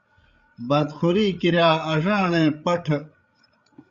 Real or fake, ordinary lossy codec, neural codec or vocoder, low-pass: fake; Opus, 64 kbps; codec, 16 kHz, 8 kbps, FreqCodec, larger model; 7.2 kHz